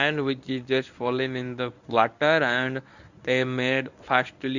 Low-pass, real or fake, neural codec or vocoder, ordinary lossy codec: 7.2 kHz; fake; codec, 24 kHz, 0.9 kbps, WavTokenizer, medium speech release version 1; none